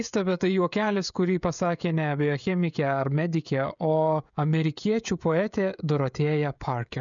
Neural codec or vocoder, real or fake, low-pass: codec, 16 kHz, 16 kbps, FreqCodec, smaller model; fake; 7.2 kHz